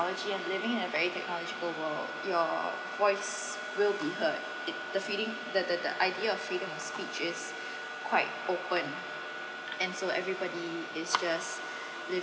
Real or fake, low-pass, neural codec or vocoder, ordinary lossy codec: real; none; none; none